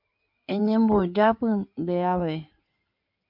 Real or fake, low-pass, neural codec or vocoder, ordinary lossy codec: fake; 5.4 kHz; codec, 16 kHz in and 24 kHz out, 2.2 kbps, FireRedTTS-2 codec; MP3, 48 kbps